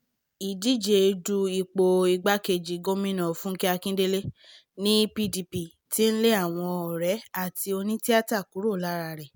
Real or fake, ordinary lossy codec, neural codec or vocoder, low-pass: real; none; none; none